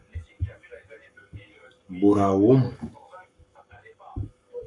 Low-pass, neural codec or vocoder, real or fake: 10.8 kHz; codec, 44.1 kHz, 7.8 kbps, Pupu-Codec; fake